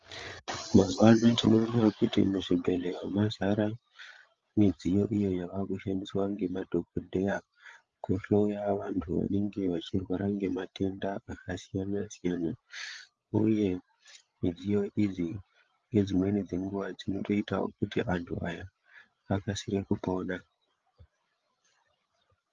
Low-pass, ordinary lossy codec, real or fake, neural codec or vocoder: 7.2 kHz; Opus, 32 kbps; fake; codec, 16 kHz, 8 kbps, FreqCodec, larger model